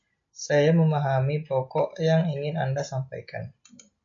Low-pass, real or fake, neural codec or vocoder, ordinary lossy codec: 7.2 kHz; real; none; MP3, 48 kbps